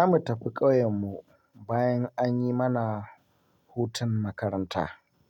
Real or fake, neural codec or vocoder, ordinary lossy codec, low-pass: real; none; MP3, 96 kbps; 19.8 kHz